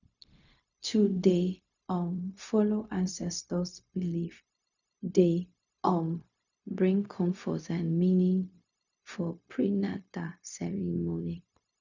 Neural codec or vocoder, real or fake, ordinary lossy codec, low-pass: codec, 16 kHz, 0.4 kbps, LongCat-Audio-Codec; fake; none; 7.2 kHz